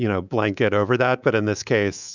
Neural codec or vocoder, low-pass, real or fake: codec, 24 kHz, 3.1 kbps, DualCodec; 7.2 kHz; fake